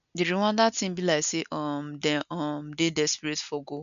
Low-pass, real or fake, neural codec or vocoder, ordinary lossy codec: 7.2 kHz; real; none; none